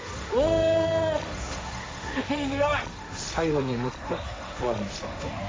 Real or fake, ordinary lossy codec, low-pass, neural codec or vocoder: fake; none; none; codec, 16 kHz, 1.1 kbps, Voila-Tokenizer